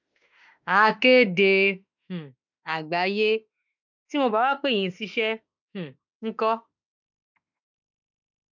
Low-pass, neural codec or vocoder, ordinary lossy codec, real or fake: 7.2 kHz; autoencoder, 48 kHz, 32 numbers a frame, DAC-VAE, trained on Japanese speech; none; fake